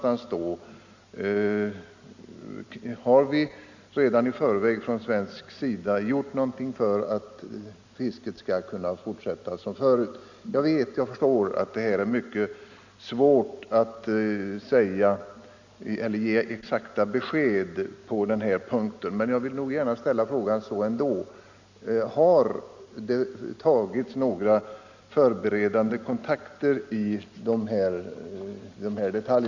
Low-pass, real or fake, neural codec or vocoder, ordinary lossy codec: 7.2 kHz; real; none; Opus, 64 kbps